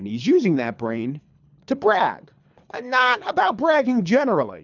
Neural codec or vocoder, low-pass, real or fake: codec, 24 kHz, 3 kbps, HILCodec; 7.2 kHz; fake